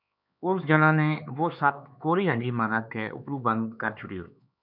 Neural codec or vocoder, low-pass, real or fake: codec, 16 kHz, 4 kbps, X-Codec, HuBERT features, trained on LibriSpeech; 5.4 kHz; fake